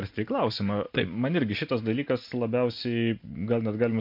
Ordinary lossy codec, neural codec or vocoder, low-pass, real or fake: MP3, 48 kbps; none; 5.4 kHz; real